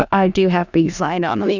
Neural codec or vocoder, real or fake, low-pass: codec, 16 kHz in and 24 kHz out, 0.4 kbps, LongCat-Audio-Codec, four codebook decoder; fake; 7.2 kHz